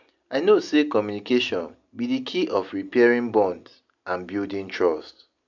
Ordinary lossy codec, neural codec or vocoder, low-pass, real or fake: none; none; 7.2 kHz; real